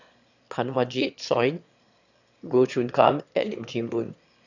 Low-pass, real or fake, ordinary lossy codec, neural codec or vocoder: 7.2 kHz; fake; none; autoencoder, 22.05 kHz, a latent of 192 numbers a frame, VITS, trained on one speaker